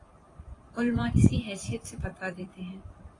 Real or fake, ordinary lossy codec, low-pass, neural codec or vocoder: fake; AAC, 32 kbps; 10.8 kHz; vocoder, 44.1 kHz, 128 mel bands every 512 samples, BigVGAN v2